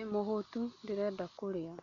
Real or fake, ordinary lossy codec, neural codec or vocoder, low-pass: real; none; none; 7.2 kHz